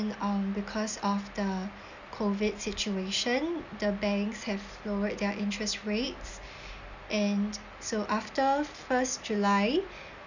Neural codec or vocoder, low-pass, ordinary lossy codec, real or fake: none; 7.2 kHz; none; real